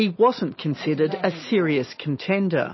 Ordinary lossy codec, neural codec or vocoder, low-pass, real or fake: MP3, 24 kbps; none; 7.2 kHz; real